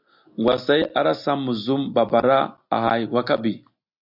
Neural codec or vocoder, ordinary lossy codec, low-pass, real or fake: none; MP3, 48 kbps; 5.4 kHz; real